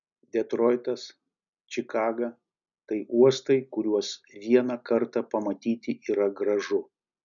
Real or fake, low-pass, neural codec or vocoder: real; 7.2 kHz; none